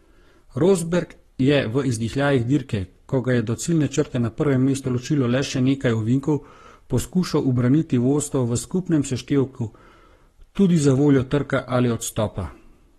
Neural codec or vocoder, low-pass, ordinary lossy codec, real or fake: codec, 44.1 kHz, 7.8 kbps, Pupu-Codec; 19.8 kHz; AAC, 32 kbps; fake